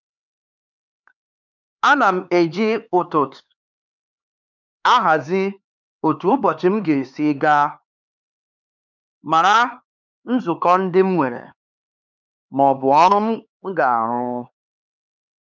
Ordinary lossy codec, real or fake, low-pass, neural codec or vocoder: none; fake; 7.2 kHz; codec, 16 kHz, 4 kbps, X-Codec, HuBERT features, trained on LibriSpeech